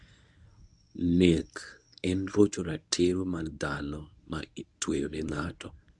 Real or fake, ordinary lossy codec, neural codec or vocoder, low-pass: fake; none; codec, 24 kHz, 0.9 kbps, WavTokenizer, medium speech release version 1; 10.8 kHz